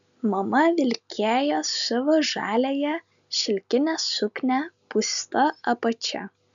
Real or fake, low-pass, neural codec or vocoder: real; 7.2 kHz; none